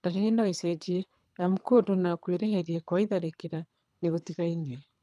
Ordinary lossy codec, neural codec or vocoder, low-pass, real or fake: none; codec, 24 kHz, 3 kbps, HILCodec; none; fake